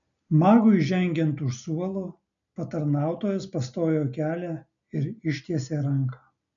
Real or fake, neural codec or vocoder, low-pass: real; none; 7.2 kHz